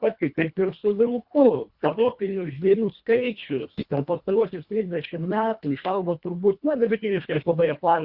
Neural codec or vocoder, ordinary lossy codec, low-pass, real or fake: codec, 24 kHz, 1.5 kbps, HILCodec; Opus, 64 kbps; 5.4 kHz; fake